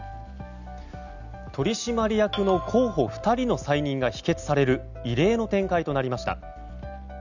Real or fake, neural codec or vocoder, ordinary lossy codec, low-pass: real; none; none; 7.2 kHz